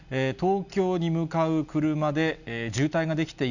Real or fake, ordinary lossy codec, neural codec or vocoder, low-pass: real; none; none; 7.2 kHz